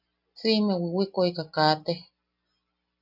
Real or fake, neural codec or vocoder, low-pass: real; none; 5.4 kHz